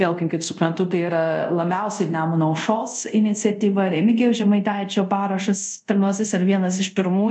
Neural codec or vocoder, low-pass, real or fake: codec, 24 kHz, 0.5 kbps, DualCodec; 10.8 kHz; fake